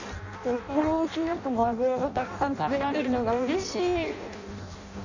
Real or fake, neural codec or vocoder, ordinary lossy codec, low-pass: fake; codec, 16 kHz in and 24 kHz out, 0.6 kbps, FireRedTTS-2 codec; none; 7.2 kHz